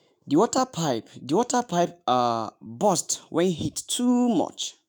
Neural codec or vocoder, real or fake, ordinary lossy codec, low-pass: autoencoder, 48 kHz, 128 numbers a frame, DAC-VAE, trained on Japanese speech; fake; none; none